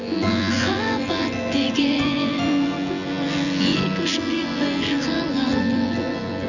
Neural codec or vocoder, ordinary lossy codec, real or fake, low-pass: vocoder, 24 kHz, 100 mel bands, Vocos; none; fake; 7.2 kHz